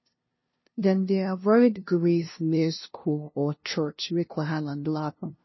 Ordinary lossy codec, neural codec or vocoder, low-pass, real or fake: MP3, 24 kbps; codec, 16 kHz, 0.5 kbps, FunCodec, trained on LibriTTS, 25 frames a second; 7.2 kHz; fake